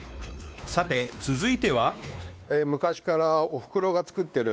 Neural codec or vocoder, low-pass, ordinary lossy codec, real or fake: codec, 16 kHz, 2 kbps, X-Codec, WavLM features, trained on Multilingual LibriSpeech; none; none; fake